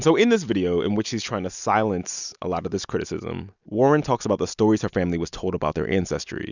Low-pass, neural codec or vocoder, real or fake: 7.2 kHz; none; real